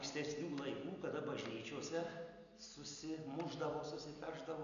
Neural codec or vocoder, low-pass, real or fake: none; 7.2 kHz; real